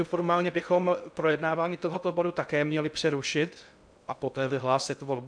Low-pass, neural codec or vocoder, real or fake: 9.9 kHz; codec, 16 kHz in and 24 kHz out, 0.6 kbps, FocalCodec, streaming, 2048 codes; fake